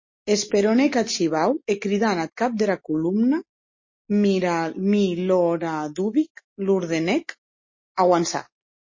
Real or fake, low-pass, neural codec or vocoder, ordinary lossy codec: real; 7.2 kHz; none; MP3, 32 kbps